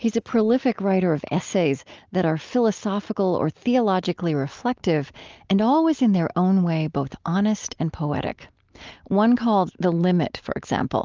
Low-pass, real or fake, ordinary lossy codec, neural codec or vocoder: 7.2 kHz; real; Opus, 24 kbps; none